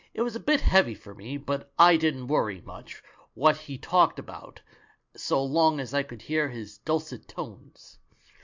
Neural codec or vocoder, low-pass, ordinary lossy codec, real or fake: none; 7.2 kHz; MP3, 64 kbps; real